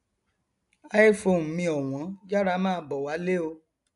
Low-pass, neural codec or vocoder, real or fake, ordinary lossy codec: 10.8 kHz; none; real; none